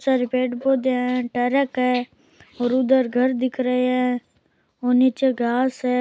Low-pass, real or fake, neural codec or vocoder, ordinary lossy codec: none; real; none; none